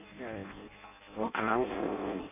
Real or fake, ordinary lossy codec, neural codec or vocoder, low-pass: fake; none; codec, 16 kHz in and 24 kHz out, 0.6 kbps, FireRedTTS-2 codec; 3.6 kHz